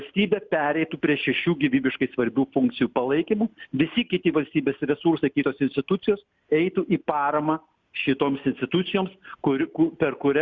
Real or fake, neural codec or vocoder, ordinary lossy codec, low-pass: real; none; Opus, 64 kbps; 7.2 kHz